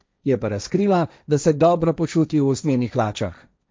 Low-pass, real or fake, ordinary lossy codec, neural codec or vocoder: none; fake; none; codec, 16 kHz, 1.1 kbps, Voila-Tokenizer